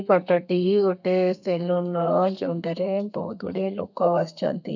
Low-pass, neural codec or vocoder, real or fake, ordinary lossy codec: 7.2 kHz; codec, 32 kHz, 1.9 kbps, SNAC; fake; none